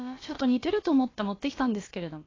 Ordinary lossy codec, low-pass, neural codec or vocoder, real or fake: AAC, 32 kbps; 7.2 kHz; codec, 16 kHz, about 1 kbps, DyCAST, with the encoder's durations; fake